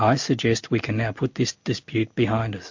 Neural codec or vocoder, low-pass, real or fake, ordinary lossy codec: none; 7.2 kHz; real; MP3, 48 kbps